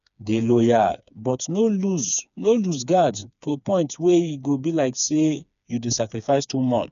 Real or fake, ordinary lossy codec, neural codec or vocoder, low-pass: fake; none; codec, 16 kHz, 4 kbps, FreqCodec, smaller model; 7.2 kHz